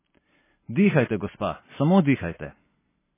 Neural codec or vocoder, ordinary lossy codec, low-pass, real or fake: none; MP3, 16 kbps; 3.6 kHz; real